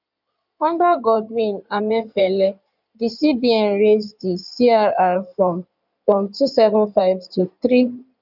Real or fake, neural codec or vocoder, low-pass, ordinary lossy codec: fake; codec, 16 kHz in and 24 kHz out, 2.2 kbps, FireRedTTS-2 codec; 5.4 kHz; none